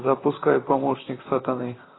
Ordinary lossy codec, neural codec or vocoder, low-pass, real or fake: AAC, 16 kbps; vocoder, 44.1 kHz, 128 mel bands, Pupu-Vocoder; 7.2 kHz; fake